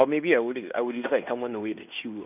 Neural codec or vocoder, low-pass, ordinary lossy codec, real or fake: codec, 16 kHz in and 24 kHz out, 0.9 kbps, LongCat-Audio-Codec, fine tuned four codebook decoder; 3.6 kHz; none; fake